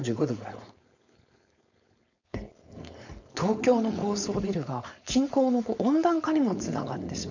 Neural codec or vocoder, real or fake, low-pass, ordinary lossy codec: codec, 16 kHz, 4.8 kbps, FACodec; fake; 7.2 kHz; none